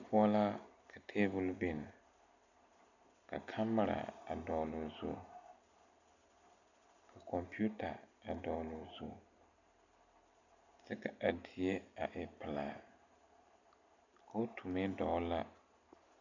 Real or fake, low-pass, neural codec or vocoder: real; 7.2 kHz; none